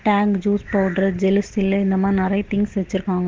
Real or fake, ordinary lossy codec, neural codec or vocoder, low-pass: real; Opus, 16 kbps; none; 7.2 kHz